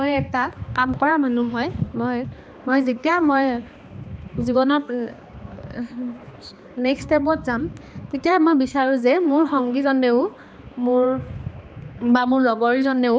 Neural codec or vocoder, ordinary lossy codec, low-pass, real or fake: codec, 16 kHz, 2 kbps, X-Codec, HuBERT features, trained on balanced general audio; none; none; fake